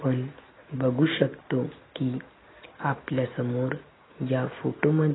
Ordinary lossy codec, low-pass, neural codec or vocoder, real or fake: AAC, 16 kbps; 7.2 kHz; none; real